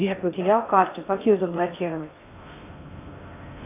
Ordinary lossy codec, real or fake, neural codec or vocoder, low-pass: AAC, 24 kbps; fake; codec, 16 kHz in and 24 kHz out, 0.6 kbps, FocalCodec, streaming, 4096 codes; 3.6 kHz